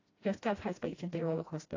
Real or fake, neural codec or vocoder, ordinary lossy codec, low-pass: fake; codec, 16 kHz, 1 kbps, FreqCodec, smaller model; AAC, 32 kbps; 7.2 kHz